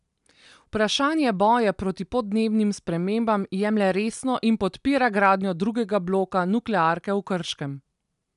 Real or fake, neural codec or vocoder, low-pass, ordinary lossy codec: real; none; 10.8 kHz; none